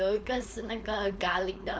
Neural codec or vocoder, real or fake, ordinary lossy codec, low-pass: codec, 16 kHz, 4.8 kbps, FACodec; fake; none; none